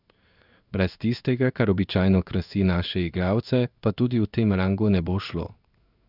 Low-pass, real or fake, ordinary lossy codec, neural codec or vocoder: 5.4 kHz; fake; none; codec, 16 kHz in and 24 kHz out, 1 kbps, XY-Tokenizer